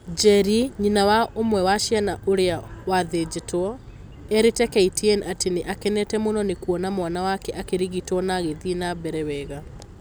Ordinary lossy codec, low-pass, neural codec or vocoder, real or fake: none; none; none; real